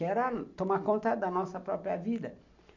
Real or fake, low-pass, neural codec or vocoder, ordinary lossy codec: real; 7.2 kHz; none; MP3, 64 kbps